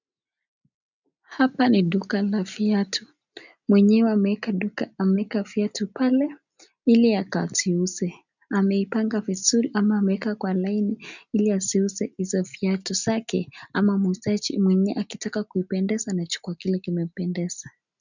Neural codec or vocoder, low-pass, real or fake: none; 7.2 kHz; real